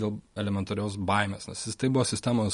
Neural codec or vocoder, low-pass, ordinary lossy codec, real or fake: none; 10.8 kHz; MP3, 48 kbps; real